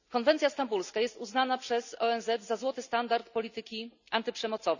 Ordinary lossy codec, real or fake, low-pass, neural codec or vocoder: none; real; 7.2 kHz; none